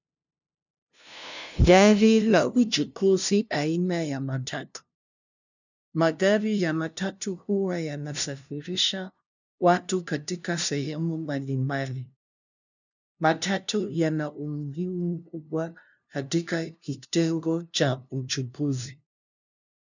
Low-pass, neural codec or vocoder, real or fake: 7.2 kHz; codec, 16 kHz, 0.5 kbps, FunCodec, trained on LibriTTS, 25 frames a second; fake